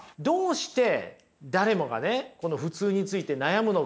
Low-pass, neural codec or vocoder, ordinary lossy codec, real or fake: none; none; none; real